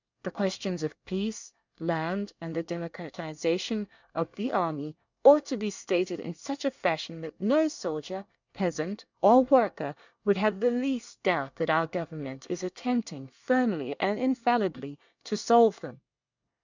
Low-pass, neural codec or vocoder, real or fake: 7.2 kHz; codec, 24 kHz, 1 kbps, SNAC; fake